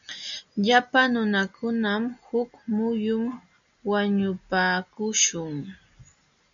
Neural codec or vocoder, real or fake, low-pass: none; real; 7.2 kHz